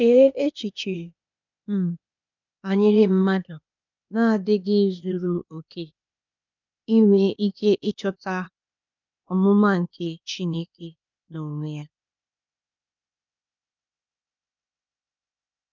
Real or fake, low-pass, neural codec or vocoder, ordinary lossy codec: fake; 7.2 kHz; codec, 16 kHz, 0.8 kbps, ZipCodec; none